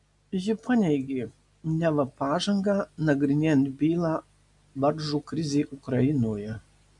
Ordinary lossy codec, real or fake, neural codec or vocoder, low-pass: MP3, 64 kbps; real; none; 10.8 kHz